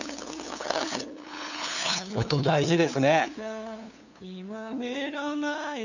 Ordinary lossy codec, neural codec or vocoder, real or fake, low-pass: none; codec, 16 kHz, 2 kbps, FunCodec, trained on LibriTTS, 25 frames a second; fake; 7.2 kHz